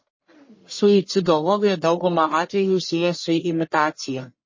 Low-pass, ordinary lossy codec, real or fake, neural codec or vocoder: 7.2 kHz; MP3, 32 kbps; fake; codec, 44.1 kHz, 1.7 kbps, Pupu-Codec